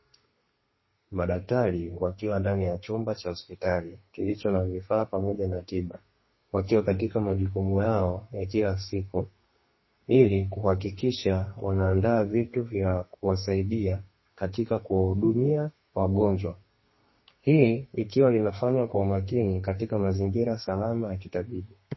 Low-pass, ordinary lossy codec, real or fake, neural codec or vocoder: 7.2 kHz; MP3, 24 kbps; fake; codec, 32 kHz, 1.9 kbps, SNAC